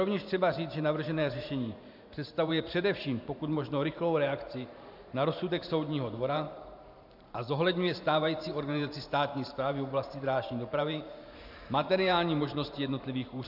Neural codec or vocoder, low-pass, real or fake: vocoder, 44.1 kHz, 128 mel bands every 512 samples, BigVGAN v2; 5.4 kHz; fake